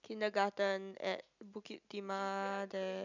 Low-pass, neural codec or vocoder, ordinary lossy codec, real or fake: 7.2 kHz; none; none; real